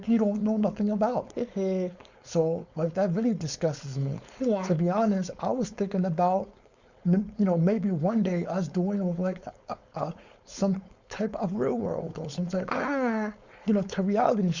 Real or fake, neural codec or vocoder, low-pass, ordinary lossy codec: fake; codec, 16 kHz, 4.8 kbps, FACodec; 7.2 kHz; Opus, 64 kbps